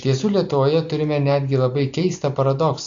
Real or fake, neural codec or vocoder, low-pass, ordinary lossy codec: real; none; 7.2 kHz; AAC, 64 kbps